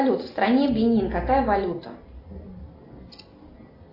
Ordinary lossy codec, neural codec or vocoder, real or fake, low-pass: AAC, 24 kbps; none; real; 5.4 kHz